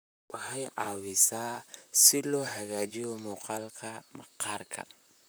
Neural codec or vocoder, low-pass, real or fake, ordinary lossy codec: vocoder, 44.1 kHz, 128 mel bands, Pupu-Vocoder; none; fake; none